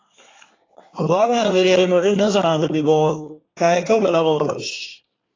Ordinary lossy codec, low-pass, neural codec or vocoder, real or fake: AAC, 32 kbps; 7.2 kHz; codec, 24 kHz, 1 kbps, SNAC; fake